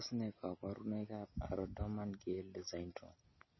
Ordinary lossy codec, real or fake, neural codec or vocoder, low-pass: MP3, 24 kbps; real; none; 7.2 kHz